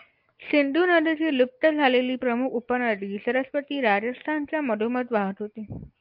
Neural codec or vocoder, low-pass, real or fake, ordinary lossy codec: none; 5.4 kHz; real; MP3, 48 kbps